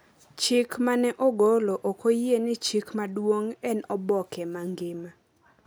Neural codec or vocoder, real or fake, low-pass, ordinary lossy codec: none; real; none; none